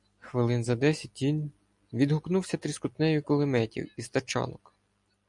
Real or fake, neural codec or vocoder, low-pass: real; none; 10.8 kHz